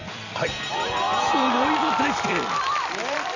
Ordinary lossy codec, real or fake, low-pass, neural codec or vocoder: none; fake; 7.2 kHz; vocoder, 44.1 kHz, 128 mel bands every 256 samples, BigVGAN v2